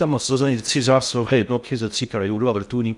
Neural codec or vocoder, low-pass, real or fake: codec, 16 kHz in and 24 kHz out, 0.6 kbps, FocalCodec, streaming, 4096 codes; 10.8 kHz; fake